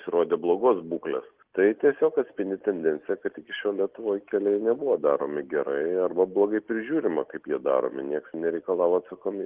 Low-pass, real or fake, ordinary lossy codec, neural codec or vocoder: 3.6 kHz; real; Opus, 16 kbps; none